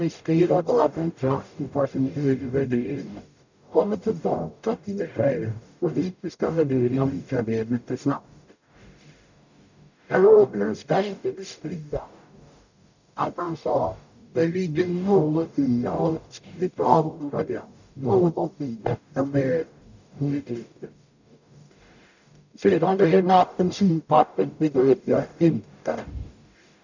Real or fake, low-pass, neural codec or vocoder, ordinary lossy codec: fake; 7.2 kHz; codec, 44.1 kHz, 0.9 kbps, DAC; none